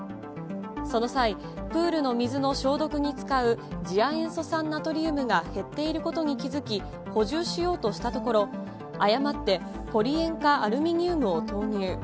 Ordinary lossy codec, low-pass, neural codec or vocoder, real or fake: none; none; none; real